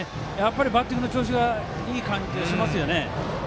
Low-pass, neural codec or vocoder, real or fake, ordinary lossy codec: none; none; real; none